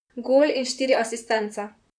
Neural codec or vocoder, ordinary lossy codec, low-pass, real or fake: vocoder, 44.1 kHz, 128 mel bands, Pupu-Vocoder; none; 9.9 kHz; fake